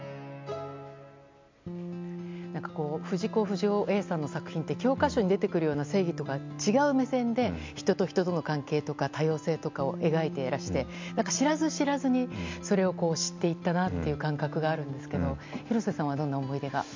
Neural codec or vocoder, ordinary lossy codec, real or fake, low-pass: none; MP3, 64 kbps; real; 7.2 kHz